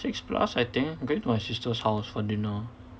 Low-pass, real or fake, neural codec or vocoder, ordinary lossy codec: none; real; none; none